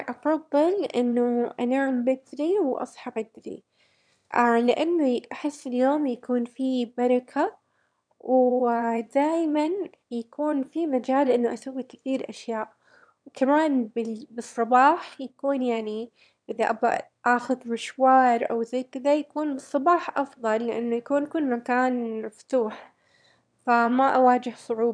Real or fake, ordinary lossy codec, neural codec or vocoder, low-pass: fake; none; autoencoder, 22.05 kHz, a latent of 192 numbers a frame, VITS, trained on one speaker; 9.9 kHz